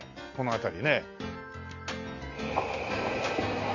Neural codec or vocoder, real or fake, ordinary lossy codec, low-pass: none; real; AAC, 48 kbps; 7.2 kHz